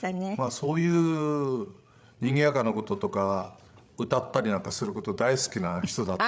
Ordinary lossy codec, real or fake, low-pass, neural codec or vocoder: none; fake; none; codec, 16 kHz, 8 kbps, FreqCodec, larger model